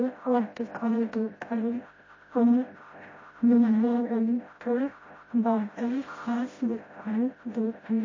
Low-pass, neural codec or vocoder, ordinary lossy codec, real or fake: 7.2 kHz; codec, 16 kHz, 0.5 kbps, FreqCodec, smaller model; MP3, 32 kbps; fake